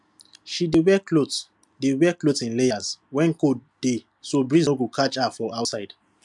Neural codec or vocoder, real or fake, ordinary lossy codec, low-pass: none; real; MP3, 96 kbps; 10.8 kHz